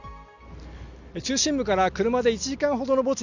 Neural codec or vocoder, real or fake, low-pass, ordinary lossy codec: none; real; 7.2 kHz; none